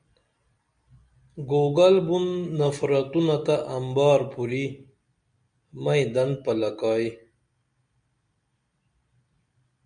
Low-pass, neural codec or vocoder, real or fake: 9.9 kHz; none; real